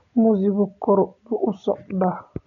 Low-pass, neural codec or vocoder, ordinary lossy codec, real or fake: 7.2 kHz; none; none; real